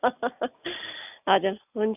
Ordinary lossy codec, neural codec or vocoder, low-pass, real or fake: none; none; 3.6 kHz; real